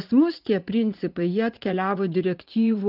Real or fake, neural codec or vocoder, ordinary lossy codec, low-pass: fake; vocoder, 44.1 kHz, 80 mel bands, Vocos; Opus, 24 kbps; 5.4 kHz